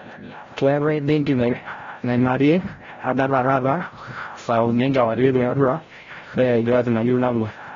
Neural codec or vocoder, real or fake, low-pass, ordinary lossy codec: codec, 16 kHz, 0.5 kbps, FreqCodec, larger model; fake; 7.2 kHz; AAC, 32 kbps